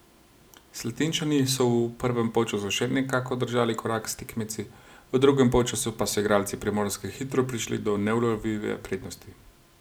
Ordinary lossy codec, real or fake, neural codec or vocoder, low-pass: none; real; none; none